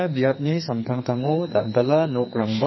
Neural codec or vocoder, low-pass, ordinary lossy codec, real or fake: codec, 44.1 kHz, 2.6 kbps, SNAC; 7.2 kHz; MP3, 24 kbps; fake